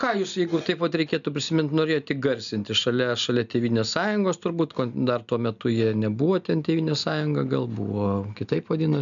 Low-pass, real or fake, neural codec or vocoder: 7.2 kHz; real; none